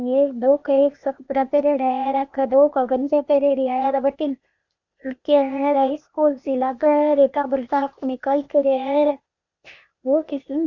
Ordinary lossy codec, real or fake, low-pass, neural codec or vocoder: Opus, 64 kbps; fake; 7.2 kHz; codec, 16 kHz, 0.8 kbps, ZipCodec